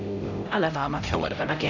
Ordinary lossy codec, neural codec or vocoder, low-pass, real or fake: none; codec, 16 kHz, 0.5 kbps, X-Codec, HuBERT features, trained on LibriSpeech; 7.2 kHz; fake